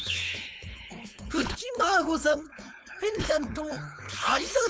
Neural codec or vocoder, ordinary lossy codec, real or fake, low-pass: codec, 16 kHz, 4.8 kbps, FACodec; none; fake; none